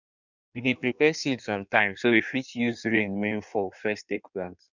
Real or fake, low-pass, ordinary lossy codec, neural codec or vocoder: fake; 7.2 kHz; none; codec, 16 kHz in and 24 kHz out, 1.1 kbps, FireRedTTS-2 codec